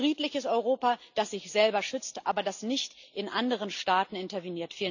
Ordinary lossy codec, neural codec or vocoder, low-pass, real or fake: none; none; 7.2 kHz; real